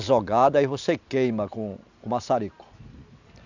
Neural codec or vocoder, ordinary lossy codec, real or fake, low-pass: none; none; real; 7.2 kHz